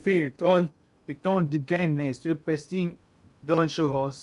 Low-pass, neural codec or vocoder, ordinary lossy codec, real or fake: 10.8 kHz; codec, 16 kHz in and 24 kHz out, 0.6 kbps, FocalCodec, streaming, 2048 codes; none; fake